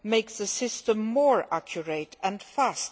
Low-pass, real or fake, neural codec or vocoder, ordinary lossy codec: none; real; none; none